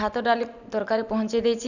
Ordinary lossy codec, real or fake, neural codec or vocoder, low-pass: none; real; none; 7.2 kHz